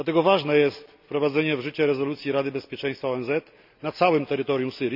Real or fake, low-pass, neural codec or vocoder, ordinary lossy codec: real; 5.4 kHz; none; none